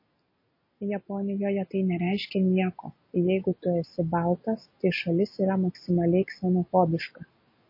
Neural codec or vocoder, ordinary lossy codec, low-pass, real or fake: none; MP3, 24 kbps; 5.4 kHz; real